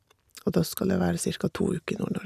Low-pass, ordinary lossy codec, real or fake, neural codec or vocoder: 14.4 kHz; none; real; none